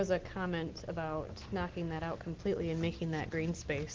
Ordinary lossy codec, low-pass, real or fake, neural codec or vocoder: Opus, 16 kbps; 7.2 kHz; real; none